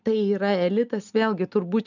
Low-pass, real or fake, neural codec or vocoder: 7.2 kHz; real; none